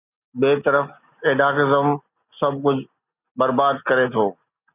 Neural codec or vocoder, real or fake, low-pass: none; real; 3.6 kHz